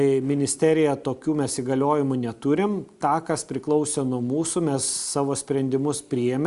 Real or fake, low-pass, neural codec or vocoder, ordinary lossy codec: real; 10.8 kHz; none; Opus, 64 kbps